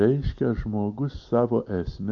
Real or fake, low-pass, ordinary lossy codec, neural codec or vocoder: real; 7.2 kHz; MP3, 48 kbps; none